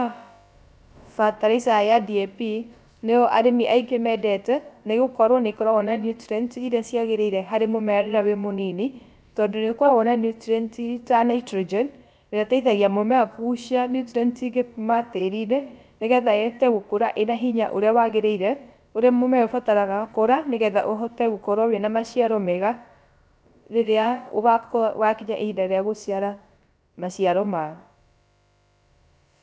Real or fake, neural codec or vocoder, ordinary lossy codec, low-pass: fake; codec, 16 kHz, about 1 kbps, DyCAST, with the encoder's durations; none; none